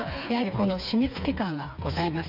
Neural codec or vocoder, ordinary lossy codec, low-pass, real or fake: codec, 16 kHz, 4 kbps, FreqCodec, smaller model; none; 5.4 kHz; fake